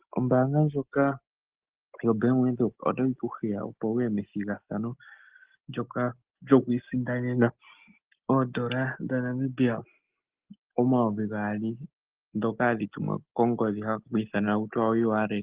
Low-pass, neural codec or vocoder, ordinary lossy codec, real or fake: 3.6 kHz; none; Opus, 16 kbps; real